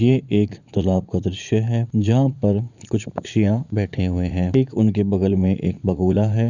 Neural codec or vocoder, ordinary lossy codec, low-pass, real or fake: vocoder, 44.1 kHz, 80 mel bands, Vocos; none; 7.2 kHz; fake